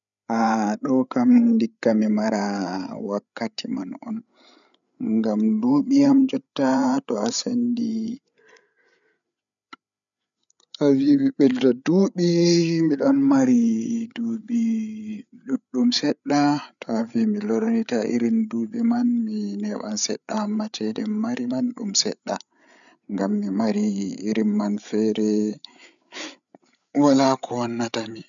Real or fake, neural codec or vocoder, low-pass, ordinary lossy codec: fake; codec, 16 kHz, 8 kbps, FreqCodec, larger model; 7.2 kHz; none